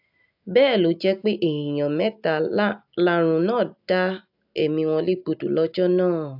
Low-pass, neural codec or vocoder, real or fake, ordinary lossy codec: 5.4 kHz; none; real; AAC, 48 kbps